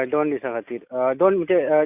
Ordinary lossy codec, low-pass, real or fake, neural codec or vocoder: none; 3.6 kHz; real; none